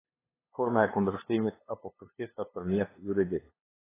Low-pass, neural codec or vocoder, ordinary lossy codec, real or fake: 3.6 kHz; codec, 16 kHz, 2 kbps, FunCodec, trained on LibriTTS, 25 frames a second; AAC, 16 kbps; fake